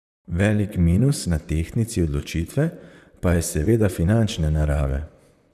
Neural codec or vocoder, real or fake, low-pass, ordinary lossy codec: vocoder, 44.1 kHz, 128 mel bands, Pupu-Vocoder; fake; 14.4 kHz; none